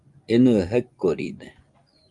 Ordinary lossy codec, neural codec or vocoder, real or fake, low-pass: Opus, 32 kbps; vocoder, 24 kHz, 100 mel bands, Vocos; fake; 10.8 kHz